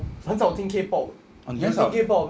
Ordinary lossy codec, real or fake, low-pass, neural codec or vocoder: none; real; none; none